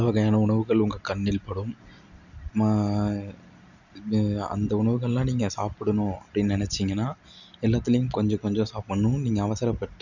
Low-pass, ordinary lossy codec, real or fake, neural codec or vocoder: 7.2 kHz; none; real; none